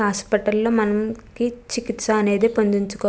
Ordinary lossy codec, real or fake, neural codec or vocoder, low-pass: none; real; none; none